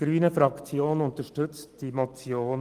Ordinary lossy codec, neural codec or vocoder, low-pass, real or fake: Opus, 24 kbps; none; 14.4 kHz; real